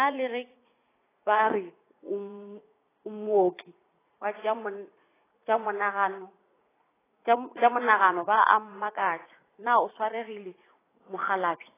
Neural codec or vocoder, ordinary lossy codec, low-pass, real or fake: vocoder, 44.1 kHz, 80 mel bands, Vocos; AAC, 16 kbps; 3.6 kHz; fake